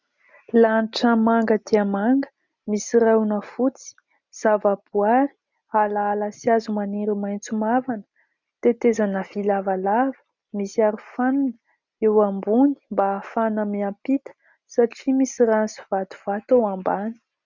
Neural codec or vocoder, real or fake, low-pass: none; real; 7.2 kHz